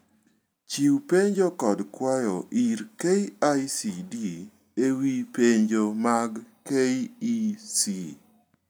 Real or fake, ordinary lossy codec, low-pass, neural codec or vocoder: real; none; none; none